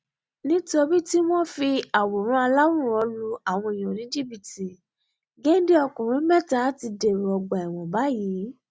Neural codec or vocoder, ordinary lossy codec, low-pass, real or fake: none; none; none; real